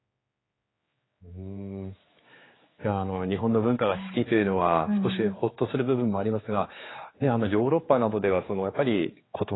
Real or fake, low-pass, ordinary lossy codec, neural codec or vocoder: fake; 7.2 kHz; AAC, 16 kbps; codec, 16 kHz, 4 kbps, X-Codec, HuBERT features, trained on general audio